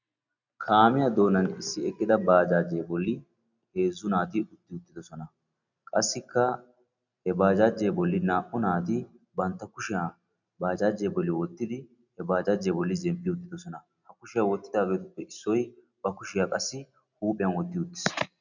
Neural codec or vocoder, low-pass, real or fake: none; 7.2 kHz; real